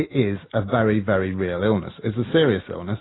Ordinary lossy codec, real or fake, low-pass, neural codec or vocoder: AAC, 16 kbps; real; 7.2 kHz; none